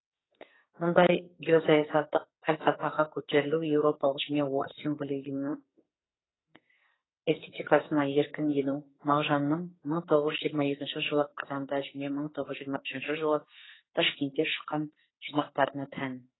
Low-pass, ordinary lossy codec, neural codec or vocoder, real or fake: 7.2 kHz; AAC, 16 kbps; codec, 44.1 kHz, 2.6 kbps, SNAC; fake